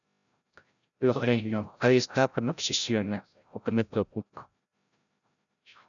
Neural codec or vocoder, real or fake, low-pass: codec, 16 kHz, 0.5 kbps, FreqCodec, larger model; fake; 7.2 kHz